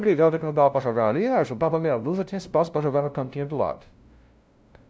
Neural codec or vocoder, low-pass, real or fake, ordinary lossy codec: codec, 16 kHz, 0.5 kbps, FunCodec, trained on LibriTTS, 25 frames a second; none; fake; none